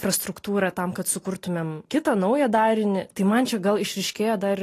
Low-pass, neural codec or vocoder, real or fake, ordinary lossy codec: 14.4 kHz; none; real; AAC, 48 kbps